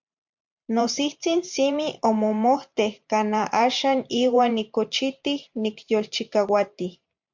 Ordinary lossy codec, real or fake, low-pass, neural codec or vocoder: MP3, 64 kbps; fake; 7.2 kHz; vocoder, 44.1 kHz, 128 mel bands every 512 samples, BigVGAN v2